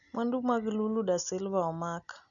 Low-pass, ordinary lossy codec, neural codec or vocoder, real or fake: 7.2 kHz; none; none; real